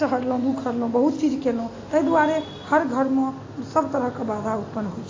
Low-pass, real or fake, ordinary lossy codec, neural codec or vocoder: 7.2 kHz; real; AAC, 32 kbps; none